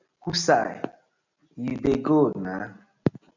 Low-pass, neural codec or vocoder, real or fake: 7.2 kHz; none; real